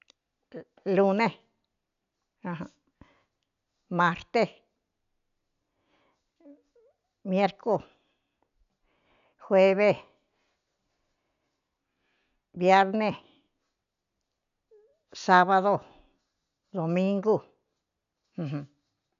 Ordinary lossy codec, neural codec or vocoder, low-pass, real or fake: none; none; 7.2 kHz; real